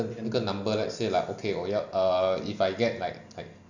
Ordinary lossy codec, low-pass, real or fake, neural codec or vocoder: none; 7.2 kHz; real; none